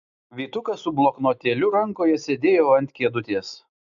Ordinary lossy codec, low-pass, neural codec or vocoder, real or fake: AAC, 96 kbps; 7.2 kHz; none; real